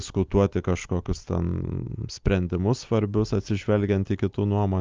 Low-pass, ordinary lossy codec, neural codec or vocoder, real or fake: 7.2 kHz; Opus, 24 kbps; none; real